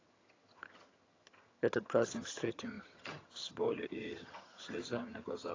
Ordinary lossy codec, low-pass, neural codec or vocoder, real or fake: AAC, 32 kbps; 7.2 kHz; vocoder, 22.05 kHz, 80 mel bands, HiFi-GAN; fake